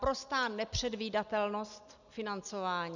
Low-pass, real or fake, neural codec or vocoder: 7.2 kHz; real; none